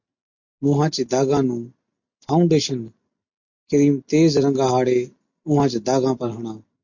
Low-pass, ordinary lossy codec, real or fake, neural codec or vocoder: 7.2 kHz; MP3, 48 kbps; real; none